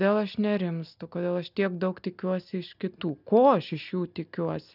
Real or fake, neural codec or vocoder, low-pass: real; none; 5.4 kHz